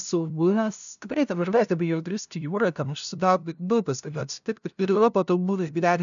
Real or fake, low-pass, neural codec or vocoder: fake; 7.2 kHz; codec, 16 kHz, 0.5 kbps, FunCodec, trained on LibriTTS, 25 frames a second